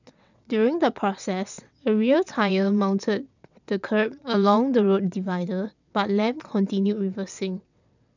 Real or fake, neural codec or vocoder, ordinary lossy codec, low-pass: fake; vocoder, 22.05 kHz, 80 mel bands, WaveNeXt; none; 7.2 kHz